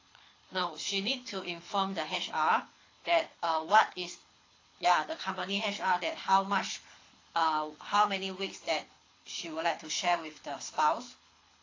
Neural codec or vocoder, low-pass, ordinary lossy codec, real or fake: codec, 24 kHz, 6 kbps, HILCodec; 7.2 kHz; AAC, 32 kbps; fake